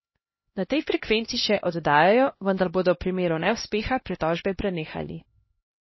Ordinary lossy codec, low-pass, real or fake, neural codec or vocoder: MP3, 24 kbps; 7.2 kHz; fake; codec, 16 kHz, 1 kbps, X-Codec, HuBERT features, trained on LibriSpeech